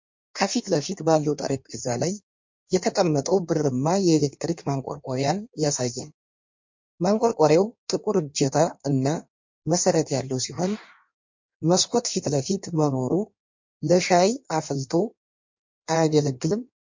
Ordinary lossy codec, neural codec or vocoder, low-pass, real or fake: MP3, 48 kbps; codec, 16 kHz in and 24 kHz out, 1.1 kbps, FireRedTTS-2 codec; 7.2 kHz; fake